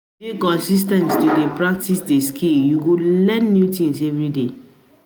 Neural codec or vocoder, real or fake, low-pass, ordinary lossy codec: none; real; none; none